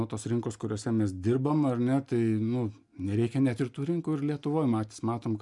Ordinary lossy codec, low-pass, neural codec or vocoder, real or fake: MP3, 96 kbps; 10.8 kHz; none; real